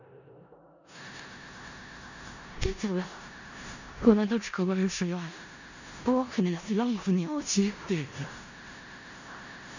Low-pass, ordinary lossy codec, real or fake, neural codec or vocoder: 7.2 kHz; none; fake; codec, 16 kHz in and 24 kHz out, 0.4 kbps, LongCat-Audio-Codec, four codebook decoder